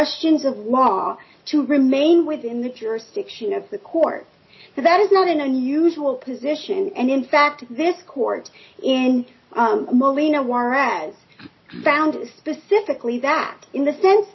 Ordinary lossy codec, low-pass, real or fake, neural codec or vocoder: MP3, 24 kbps; 7.2 kHz; real; none